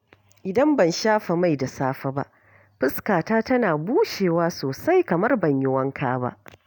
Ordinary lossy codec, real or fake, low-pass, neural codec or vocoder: none; real; 19.8 kHz; none